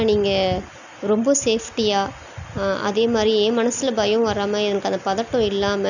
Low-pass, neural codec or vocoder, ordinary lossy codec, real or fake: 7.2 kHz; none; none; real